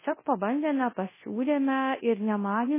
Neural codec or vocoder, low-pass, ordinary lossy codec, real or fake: codec, 24 kHz, 0.9 kbps, WavTokenizer, large speech release; 3.6 kHz; MP3, 16 kbps; fake